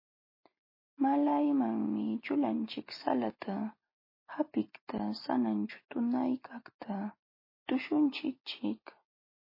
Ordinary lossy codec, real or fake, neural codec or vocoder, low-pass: MP3, 24 kbps; real; none; 5.4 kHz